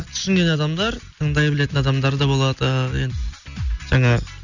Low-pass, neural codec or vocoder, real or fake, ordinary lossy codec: 7.2 kHz; none; real; none